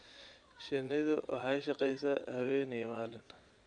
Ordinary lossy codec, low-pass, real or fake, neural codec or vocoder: none; 9.9 kHz; fake; vocoder, 22.05 kHz, 80 mel bands, Vocos